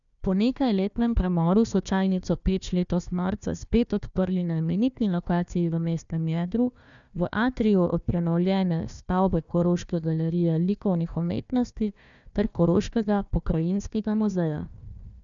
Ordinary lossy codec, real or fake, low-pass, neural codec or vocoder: none; fake; 7.2 kHz; codec, 16 kHz, 1 kbps, FunCodec, trained on Chinese and English, 50 frames a second